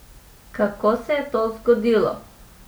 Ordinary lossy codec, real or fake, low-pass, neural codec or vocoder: none; real; none; none